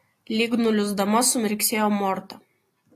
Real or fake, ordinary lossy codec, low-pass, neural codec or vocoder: real; AAC, 48 kbps; 14.4 kHz; none